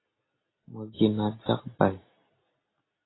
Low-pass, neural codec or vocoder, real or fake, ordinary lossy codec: 7.2 kHz; none; real; AAC, 16 kbps